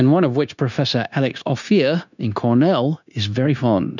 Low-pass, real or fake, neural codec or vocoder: 7.2 kHz; fake; codec, 16 kHz, 0.9 kbps, LongCat-Audio-Codec